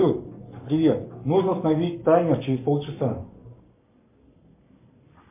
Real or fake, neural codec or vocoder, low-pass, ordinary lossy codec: fake; codec, 44.1 kHz, 7.8 kbps, Pupu-Codec; 3.6 kHz; MP3, 32 kbps